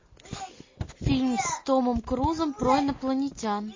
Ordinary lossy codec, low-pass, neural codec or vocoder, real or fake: MP3, 32 kbps; 7.2 kHz; none; real